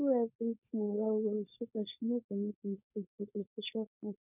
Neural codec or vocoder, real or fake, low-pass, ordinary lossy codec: codec, 16 kHz, 4.8 kbps, FACodec; fake; 3.6 kHz; none